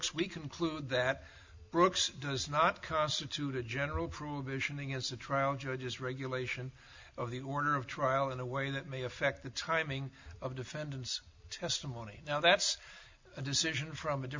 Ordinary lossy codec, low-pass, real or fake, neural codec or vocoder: MP3, 64 kbps; 7.2 kHz; real; none